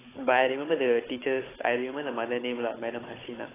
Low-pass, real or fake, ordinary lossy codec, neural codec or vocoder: 3.6 kHz; fake; AAC, 16 kbps; codec, 16 kHz, 16 kbps, FunCodec, trained on Chinese and English, 50 frames a second